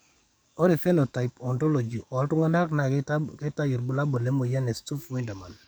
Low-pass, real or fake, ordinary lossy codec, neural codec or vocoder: none; fake; none; codec, 44.1 kHz, 7.8 kbps, DAC